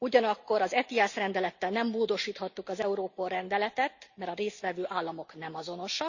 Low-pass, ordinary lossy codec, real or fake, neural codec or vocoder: 7.2 kHz; Opus, 64 kbps; real; none